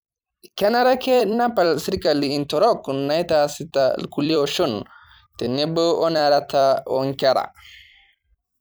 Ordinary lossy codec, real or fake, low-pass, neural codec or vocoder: none; fake; none; vocoder, 44.1 kHz, 128 mel bands every 256 samples, BigVGAN v2